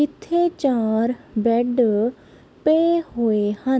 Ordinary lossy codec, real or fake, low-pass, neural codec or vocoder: none; real; none; none